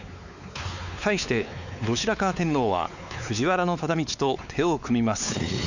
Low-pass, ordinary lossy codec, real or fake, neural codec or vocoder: 7.2 kHz; none; fake; codec, 16 kHz, 4 kbps, X-Codec, WavLM features, trained on Multilingual LibriSpeech